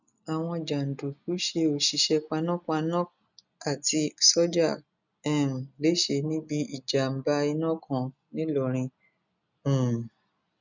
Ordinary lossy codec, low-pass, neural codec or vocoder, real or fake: none; 7.2 kHz; none; real